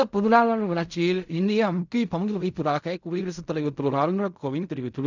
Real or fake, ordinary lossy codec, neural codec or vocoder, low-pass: fake; none; codec, 16 kHz in and 24 kHz out, 0.4 kbps, LongCat-Audio-Codec, fine tuned four codebook decoder; 7.2 kHz